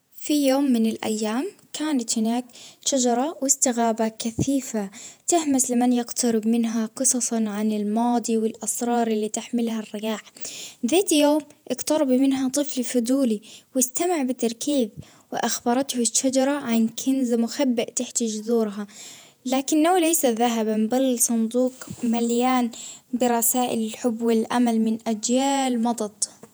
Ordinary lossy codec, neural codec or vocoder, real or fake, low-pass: none; vocoder, 48 kHz, 128 mel bands, Vocos; fake; none